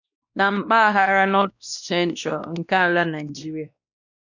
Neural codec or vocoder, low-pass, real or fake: codec, 16 kHz, 1 kbps, X-Codec, WavLM features, trained on Multilingual LibriSpeech; 7.2 kHz; fake